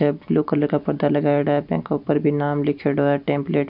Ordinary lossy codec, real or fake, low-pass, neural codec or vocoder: none; real; 5.4 kHz; none